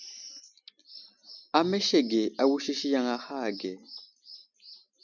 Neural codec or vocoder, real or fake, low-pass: none; real; 7.2 kHz